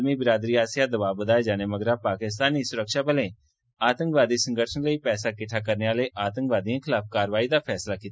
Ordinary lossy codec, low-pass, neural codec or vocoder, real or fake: none; none; none; real